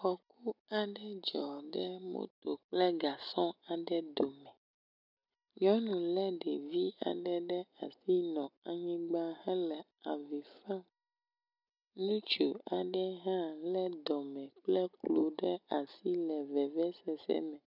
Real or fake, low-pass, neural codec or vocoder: real; 5.4 kHz; none